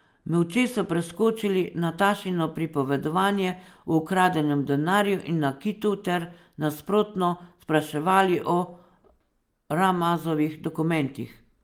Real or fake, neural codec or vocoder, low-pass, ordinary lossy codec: real; none; 19.8 kHz; Opus, 32 kbps